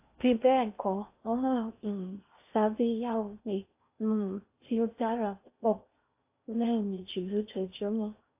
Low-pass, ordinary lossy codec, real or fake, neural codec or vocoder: 3.6 kHz; AAC, 32 kbps; fake; codec, 16 kHz in and 24 kHz out, 0.8 kbps, FocalCodec, streaming, 65536 codes